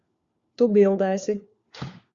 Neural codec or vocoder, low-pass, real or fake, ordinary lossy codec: codec, 16 kHz, 4 kbps, FunCodec, trained on LibriTTS, 50 frames a second; 7.2 kHz; fake; Opus, 64 kbps